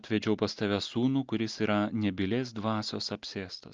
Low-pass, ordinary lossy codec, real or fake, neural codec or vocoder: 7.2 kHz; Opus, 24 kbps; real; none